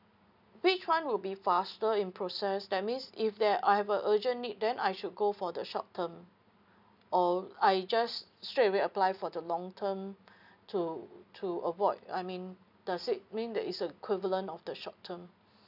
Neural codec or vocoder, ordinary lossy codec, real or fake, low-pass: none; none; real; 5.4 kHz